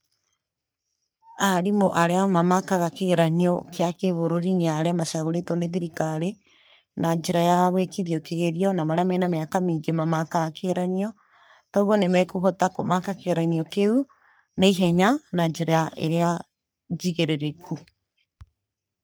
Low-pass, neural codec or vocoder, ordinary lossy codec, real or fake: none; codec, 44.1 kHz, 3.4 kbps, Pupu-Codec; none; fake